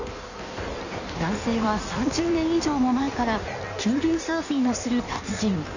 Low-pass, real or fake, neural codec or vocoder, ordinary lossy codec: 7.2 kHz; fake; codec, 16 kHz in and 24 kHz out, 1.1 kbps, FireRedTTS-2 codec; none